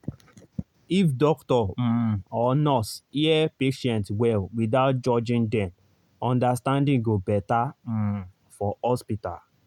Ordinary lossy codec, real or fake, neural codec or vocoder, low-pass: none; real; none; 19.8 kHz